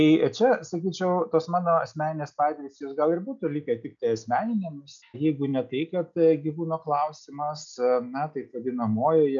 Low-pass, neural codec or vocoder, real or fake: 7.2 kHz; none; real